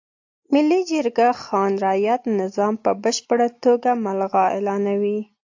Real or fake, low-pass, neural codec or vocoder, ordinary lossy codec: real; 7.2 kHz; none; AAC, 48 kbps